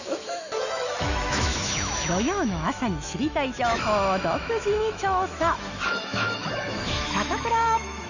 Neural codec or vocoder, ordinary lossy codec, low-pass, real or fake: autoencoder, 48 kHz, 128 numbers a frame, DAC-VAE, trained on Japanese speech; none; 7.2 kHz; fake